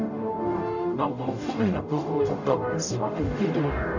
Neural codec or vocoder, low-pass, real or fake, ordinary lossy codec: codec, 44.1 kHz, 0.9 kbps, DAC; 7.2 kHz; fake; none